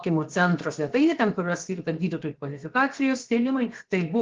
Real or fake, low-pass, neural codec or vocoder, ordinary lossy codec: fake; 7.2 kHz; codec, 16 kHz, 0.7 kbps, FocalCodec; Opus, 16 kbps